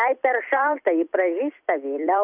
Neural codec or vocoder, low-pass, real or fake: vocoder, 44.1 kHz, 128 mel bands every 256 samples, BigVGAN v2; 3.6 kHz; fake